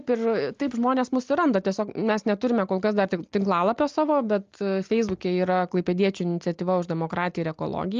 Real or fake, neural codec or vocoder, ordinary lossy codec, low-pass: real; none; Opus, 24 kbps; 7.2 kHz